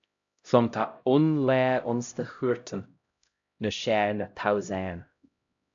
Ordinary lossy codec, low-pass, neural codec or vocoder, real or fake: AAC, 64 kbps; 7.2 kHz; codec, 16 kHz, 0.5 kbps, X-Codec, HuBERT features, trained on LibriSpeech; fake